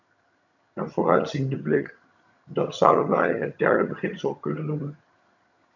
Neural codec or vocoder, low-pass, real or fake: vocoder, 22.05 kHz, 80 mel bands, HiFi-GAN; 7.2 kHz; fake